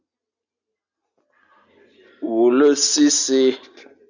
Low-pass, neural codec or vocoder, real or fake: 7.2 kHz; none; real